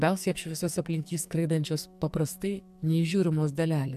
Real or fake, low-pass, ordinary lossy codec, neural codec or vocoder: fake; 14.4 kHz; AAC, 96 kbps; codec, 44.1 kHz, 2.6 kbps, SNAC